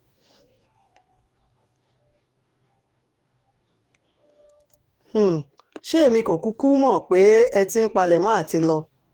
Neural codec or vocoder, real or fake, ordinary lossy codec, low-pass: codec, 44.1 kHz, 2.6 kbps, DAC; fake; Opus, 32 kbps; 19.8 kHz